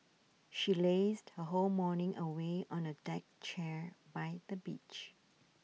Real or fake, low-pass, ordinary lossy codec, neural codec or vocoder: real; none; none; none